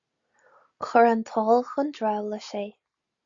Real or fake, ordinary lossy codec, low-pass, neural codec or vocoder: real; Opus, 64 kbps; 7.2 kHz; none